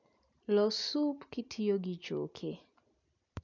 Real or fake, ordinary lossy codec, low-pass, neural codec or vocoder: real; none; 7.2 kHz; none